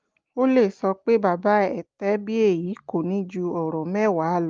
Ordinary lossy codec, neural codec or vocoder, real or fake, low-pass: Opus, 32 kbps; none; real; 7.2 kHz